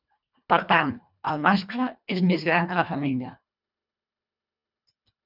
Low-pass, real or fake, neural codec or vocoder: 5.4 kHz; fake; codec, 24 kHz, 1.5 kbps, HILCodec